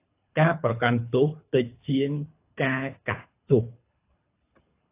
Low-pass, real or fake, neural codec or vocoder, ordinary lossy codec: 3.6 kHz; fake; codec, 24 kHz, 3 kbps, HILCodec; AAC, 24 kbps